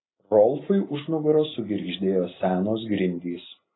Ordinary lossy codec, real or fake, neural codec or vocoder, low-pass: AAC, 16 kbps; real; none; 7.2 kHz